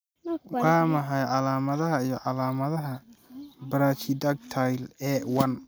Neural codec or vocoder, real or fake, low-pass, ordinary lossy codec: none; real; none; none